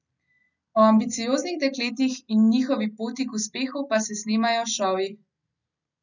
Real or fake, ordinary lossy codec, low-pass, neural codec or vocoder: real; none; 7.2 kHz; none